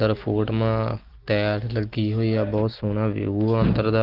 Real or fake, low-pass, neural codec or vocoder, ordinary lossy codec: real; 5.4 kHz; none; Opus, 16 kbps